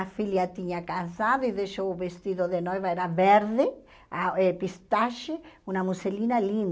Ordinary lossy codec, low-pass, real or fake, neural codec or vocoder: none; none; real; none